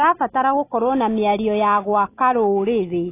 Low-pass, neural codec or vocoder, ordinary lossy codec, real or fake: 3.6 kHz; none; AAC, 24 kbps; real